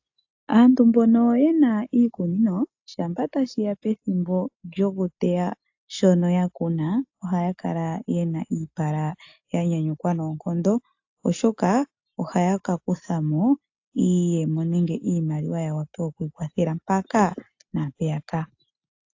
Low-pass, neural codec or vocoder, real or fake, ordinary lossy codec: 7.2 kHz; none; real; AAC, 48 kbps